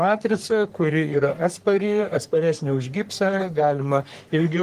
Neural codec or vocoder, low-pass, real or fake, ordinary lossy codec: codec, 44.1 kHz, 3.4 kbps, Pupu-Codec; 14.4 kHz; fake; Opus, 24 kbps